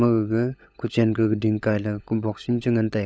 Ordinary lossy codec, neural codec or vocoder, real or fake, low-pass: none; codec, 16 kHz, 16 kbps, FunCodec, trained on LibriTTS, 50 frames a second; fake; none